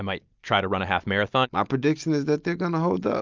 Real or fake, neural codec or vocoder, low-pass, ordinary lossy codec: real; none; 7.2 kHz; Opus, 24 kbps